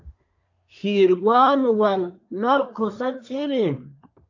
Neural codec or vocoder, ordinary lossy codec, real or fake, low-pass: codec, 24 kHz, 1 kbps, SNAC; AAC, 48 kbps; fake; 7.2 kHz